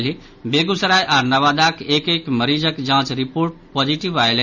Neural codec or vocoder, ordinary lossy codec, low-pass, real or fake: none; none; 7.2 kHz; real